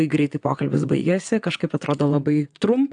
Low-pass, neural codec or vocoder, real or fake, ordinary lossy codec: 9.9 kHz; vocoder, 22.05 kHz, 80 mel bands, WaveNeXt; fake; AAC, 64 kbps